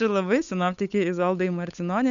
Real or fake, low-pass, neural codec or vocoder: fake; 7.2 kHz; codec, 16 kHz, 8 kbps, FunCodec, trained on Chinese and English, 25 frames a second